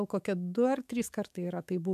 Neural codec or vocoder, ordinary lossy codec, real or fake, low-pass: autoencoder, 48 kHz, 128 numbers a frame, DAC-VAE, trained on Japanese speech; MP3, 96 kbps; fake; 14.4 kHz